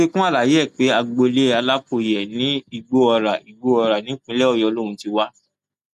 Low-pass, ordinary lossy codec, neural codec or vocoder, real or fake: 14.4 kHz; none; none; real